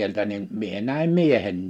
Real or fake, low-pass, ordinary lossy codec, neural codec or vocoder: real; 19.8 kHz; none; none